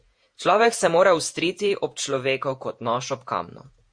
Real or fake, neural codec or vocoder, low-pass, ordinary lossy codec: fake; vocoder, 48 kHz, 128 mel bands, Vocos; 9.9 kHz; MP3, 48 kbps